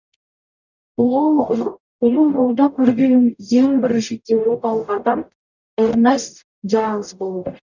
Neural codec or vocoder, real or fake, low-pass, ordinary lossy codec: codec, 44.1 kHz, 0.9 kbps, DAC; fake; 7.2 kHz; none